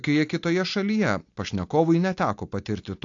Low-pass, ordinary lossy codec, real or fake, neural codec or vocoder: 7.2 kHz; AAC, 48 kbps; real; none